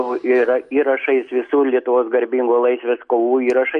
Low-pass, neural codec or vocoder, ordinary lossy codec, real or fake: 9.9 kHz; none; MP3, 48 kbps; real